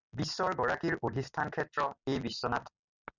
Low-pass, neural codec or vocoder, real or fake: 7.2 kHz; none; real